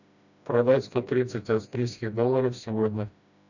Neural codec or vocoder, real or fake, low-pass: codec, 16 kHz, 1 kbps, FreqCodec, smaller model; fake; 7.2 kHz